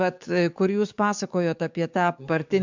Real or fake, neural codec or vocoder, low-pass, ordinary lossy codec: fake; codec, 44.1 kHz, 7.8 kbps, DAC; 7.2 kHz; MP3, 64 kbps